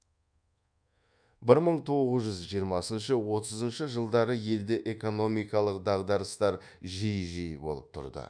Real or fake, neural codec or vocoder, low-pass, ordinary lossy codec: fake; codec, 24 kHz, 1.2 kbps, DualCodec; 9.9 kHz; none